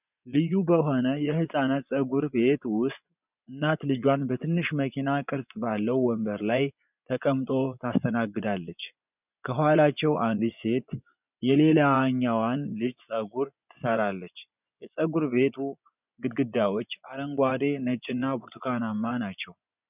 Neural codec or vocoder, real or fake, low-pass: vocoder, 24 kHz, 100 mel bands, Vocos; fake; 3.6 kHz